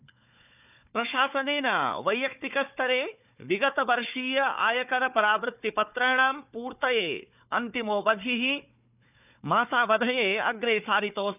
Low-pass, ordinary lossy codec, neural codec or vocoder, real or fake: 3.6 kHz; none; codec, 16 kHz, 4 kbps, FunCodec, trained on Chinese and English, 50 frames a second; fake